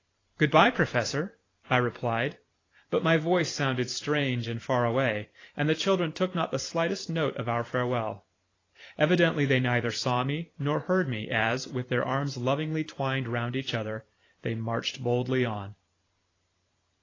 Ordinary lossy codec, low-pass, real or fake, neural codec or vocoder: AAC, 32 kbps; 7.2 kHz; fake; vocoder, 44.1 kHz, 128 mel bands every 512 samples, BigVGAN v2